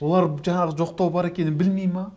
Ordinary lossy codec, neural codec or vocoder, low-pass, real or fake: none; none; none; real